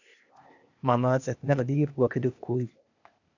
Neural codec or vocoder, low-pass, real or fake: codec, 16 kHz, 0.8 kbps, ZipCodec; 7.2 kHz; fake